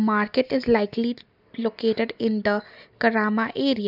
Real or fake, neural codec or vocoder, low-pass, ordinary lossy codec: real; none; 5.4 kHz; none